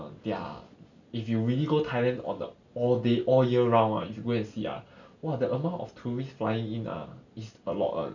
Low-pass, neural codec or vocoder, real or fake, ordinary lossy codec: 7.2 kHz; none; real; none